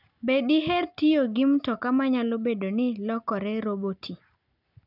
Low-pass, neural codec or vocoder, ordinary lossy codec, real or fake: 5.4 kHz; none; none; real